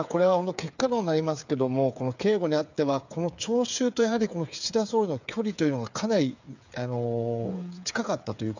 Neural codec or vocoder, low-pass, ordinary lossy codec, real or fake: codec, 16 kHz, 8 kbps, FreqCodec, smaller model; 7.2 kHz; none; fake